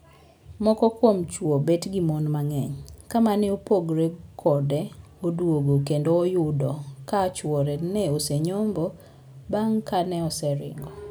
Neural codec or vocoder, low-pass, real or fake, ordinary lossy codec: none; none; real; none